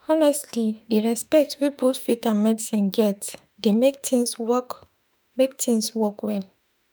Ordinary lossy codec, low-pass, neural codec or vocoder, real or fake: none; none; autoencoder, 48 kHz, 32 numbers a frame, DAC-VAE, trained on Japanese speech; fake